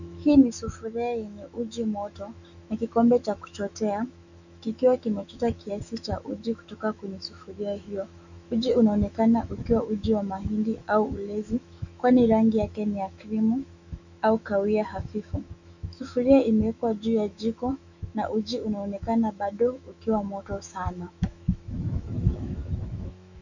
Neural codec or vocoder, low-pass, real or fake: autoencoder, 48 kHz, 128 numbers a frame, DAC-VAE, trained on Japanese speech; 7.2 kHz; fake